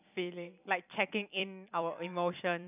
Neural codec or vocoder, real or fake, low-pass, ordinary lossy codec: vocoder, 44.1 kHz, 128 mel bands every 256 samples, BigVGAN v2; fake; 3.6 kHz; none